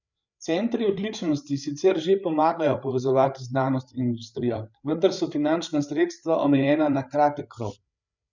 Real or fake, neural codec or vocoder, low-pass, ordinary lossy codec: fake; codec, 16 kHz, 8 kbps, FreqCodec, larger model; 7.2 kHz; none